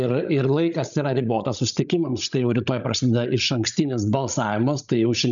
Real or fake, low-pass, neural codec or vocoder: fake; 7.2 kHz; codec, 16 kHz, 16 kbps, FreqCodec, larger model